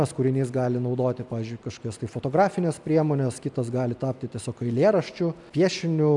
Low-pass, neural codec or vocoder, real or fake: 10.8 kHz; none; real